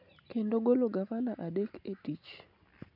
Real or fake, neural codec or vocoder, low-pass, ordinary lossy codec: real; none; 5.4 kHz; none